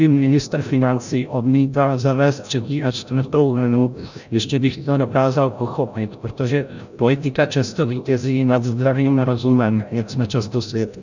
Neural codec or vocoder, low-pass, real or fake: codec, 16 kHz, 0.5 kbps, FreqCodec, larger model; 7.2 kHz; fake